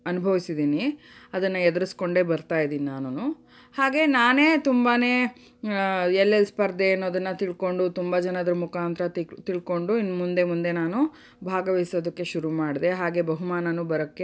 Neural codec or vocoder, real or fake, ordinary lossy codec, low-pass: none; real; none; none